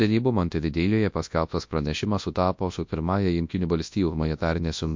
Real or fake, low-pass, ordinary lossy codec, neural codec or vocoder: fake; 7.2 kHz; MP3, 48 kbps; codec, 24 kHz, 0.9 kbps, WavTokenizer, large speech release